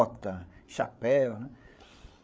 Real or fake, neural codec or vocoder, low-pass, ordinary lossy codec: fake; codec, 16 kHz, 8 kbps, FreqCodec, larger model; none; none